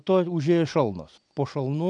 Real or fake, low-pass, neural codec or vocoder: real; 9.9 kHz; none